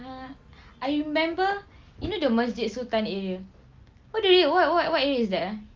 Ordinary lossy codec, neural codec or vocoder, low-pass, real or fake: Opus, 32 kbps; none; 7.2 kHz; real